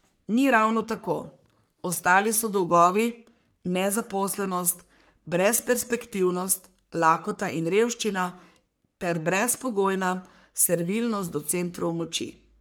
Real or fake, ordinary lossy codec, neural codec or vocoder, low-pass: fake; none; codec, 44.1 kHz, 3.4 kbps, Pupu-Codec; none